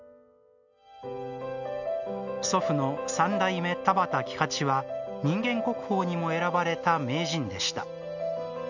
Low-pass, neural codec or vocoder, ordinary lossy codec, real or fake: 7.2 kHz; none; none; real